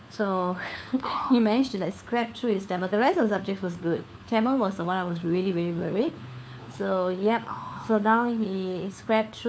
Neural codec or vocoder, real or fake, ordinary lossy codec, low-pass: codec, 16 kHz, 4 kbps, FunCodec, trained on LibriTTS, 50 frames a second; fake; none; none